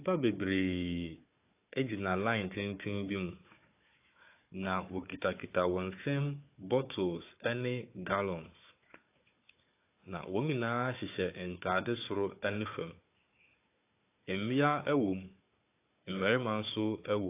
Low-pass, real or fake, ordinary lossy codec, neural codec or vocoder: 3.6 kHz; fake; AAC, 24 kbps; codec, 16 kHz, 4 kbps, FunCodec, trained on Chinese and English, 50 frames a second